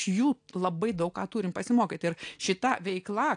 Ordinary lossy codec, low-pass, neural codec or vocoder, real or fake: AAC, 64 kbps; 9.9 kHz; none; real